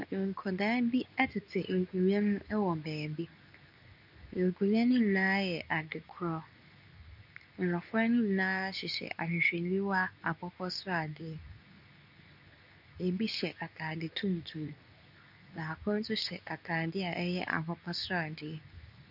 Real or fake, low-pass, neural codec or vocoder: fake; 5.4 kHz; codec, 24 kHz, 0.9 kbps, WavTokenizer, medium speech release version 2